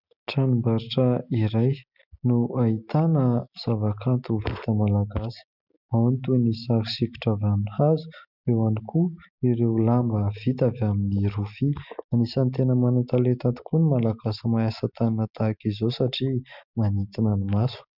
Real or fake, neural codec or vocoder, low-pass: real; none; 5.4 kHz